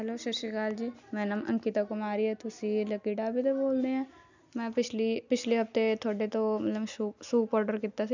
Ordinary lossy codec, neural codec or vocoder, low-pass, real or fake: none; none; 7.2 kHz; real